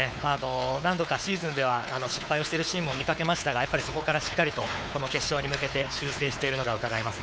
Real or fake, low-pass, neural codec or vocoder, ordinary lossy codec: fake; none; codec, 16 kHz, 4 kbps, X-Codec, WavLM features, trained on Multilingual LibriSpeech; none